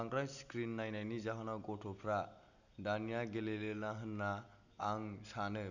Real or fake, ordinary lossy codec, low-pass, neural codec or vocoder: real; none; 7.2 kHz; none